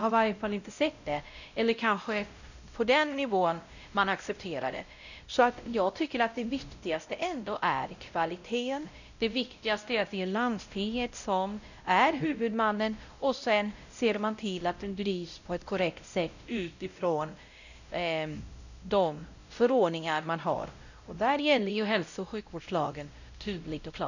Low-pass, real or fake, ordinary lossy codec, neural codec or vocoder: 7.2 kHz; fake; none; codec, 16 kHz, 0.5 kbps, X-Codec, WavLM features, trained on Multilingual LibriSpeech